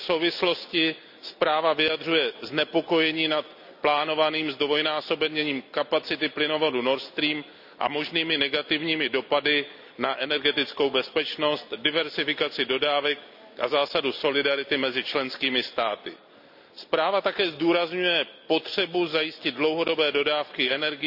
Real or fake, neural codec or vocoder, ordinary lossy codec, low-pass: real; none; none; 5.4 kHz